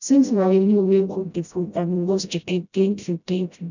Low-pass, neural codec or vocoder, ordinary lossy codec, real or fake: 7.2 kHz; codec, 16 kHz, 0.5 kbps, FreqCodec, smaller model; none; fake